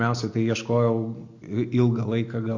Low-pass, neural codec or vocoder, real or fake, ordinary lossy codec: 7.2 kHz; none; real; AAC, 48 kbps